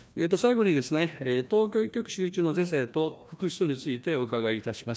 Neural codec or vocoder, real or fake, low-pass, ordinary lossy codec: codec, 16 kHz, 1 kbps, FreqCodec, larger model; fake; none; none